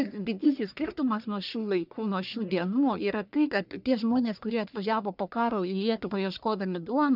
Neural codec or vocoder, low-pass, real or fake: codec, 44.1 kHz, 1.7 kbps, Pupu-Codec; 5.4 kHz; fake